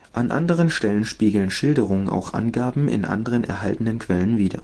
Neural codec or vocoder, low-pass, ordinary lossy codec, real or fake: autoencoder, 48 kHz, 128 numbers a frame, DAC-VAE, trained on Japanese speech; 10.8 kHz; Opus, 16 kbps; fake